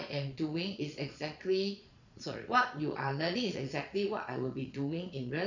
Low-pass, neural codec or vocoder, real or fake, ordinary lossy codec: 7.2 kHz; vocoder, 22.05 kHz, 80 mel bands, Vocos; fake; none